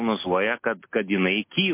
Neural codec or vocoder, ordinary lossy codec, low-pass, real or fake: none; MP3, 24 kbps; 3.6 kHz; real